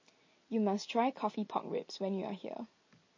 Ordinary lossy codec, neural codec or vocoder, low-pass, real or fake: MP3, 32 kbps; none; 7.2 kHz; real